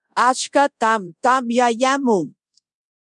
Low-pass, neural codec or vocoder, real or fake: 10.8 kHz; codec, 24 kHz, 0.5 kbps, DualCodec; fake